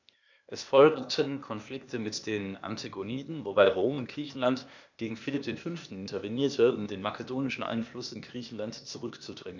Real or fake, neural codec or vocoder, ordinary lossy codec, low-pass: fake; codec, 16 kHz, 0.8 kbps, ZipCodec; none; 7.2 kHz